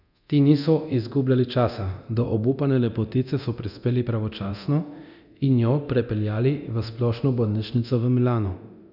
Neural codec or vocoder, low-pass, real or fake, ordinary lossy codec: codec, 24 kHz, 0.9 kbps, DualCodec; 5.4 kHz; fake; none